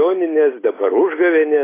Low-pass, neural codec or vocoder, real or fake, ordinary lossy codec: 3.6 kHz; none; real; AAC, 16 kbps